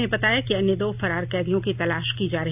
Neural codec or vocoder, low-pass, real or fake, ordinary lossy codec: none; 3.6 kHz; real; none